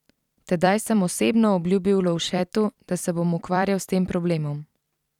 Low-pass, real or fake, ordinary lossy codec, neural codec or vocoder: 19.8 kHz; fake; none; vocoder, 44.1 kHz, 128 mel bands every 256 samples, BigVGAN v2